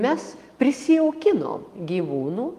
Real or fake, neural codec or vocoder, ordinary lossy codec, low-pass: real; none; Opus, 32 kbps; 14.4 kHz